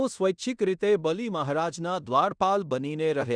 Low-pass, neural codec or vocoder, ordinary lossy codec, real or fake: 9.9 kHz; codec, 16 kHz in and 24 kHz out, 0.9 kbps, LongCat-Audio-Codec, fine tuned four codebook decoder; none; fake